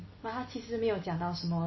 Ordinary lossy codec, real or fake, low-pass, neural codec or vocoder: MP3, 24 kbps; real; 7.2 kHz; none